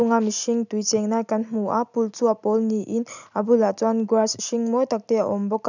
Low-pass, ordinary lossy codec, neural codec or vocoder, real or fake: 7.2 kHz; none; none; real